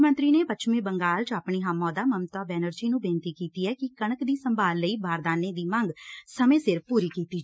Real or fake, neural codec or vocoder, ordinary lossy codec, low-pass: real; none; none; none